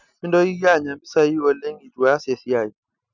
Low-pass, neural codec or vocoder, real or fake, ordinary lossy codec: 7.2 kHz; none; real; none